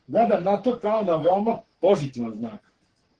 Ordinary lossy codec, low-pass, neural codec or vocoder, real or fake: Opus, 16 kbps; 9.9 kHz; codec, 44.1 kHz, 3.4 kbps, Pupu-Codec; fake